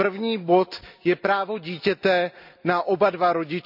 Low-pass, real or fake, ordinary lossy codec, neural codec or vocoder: 5.4 kHz; real; none; none